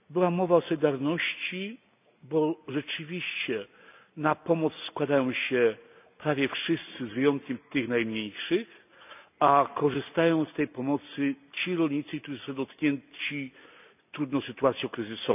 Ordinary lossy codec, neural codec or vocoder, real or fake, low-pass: none; none; real; 3.6 kHz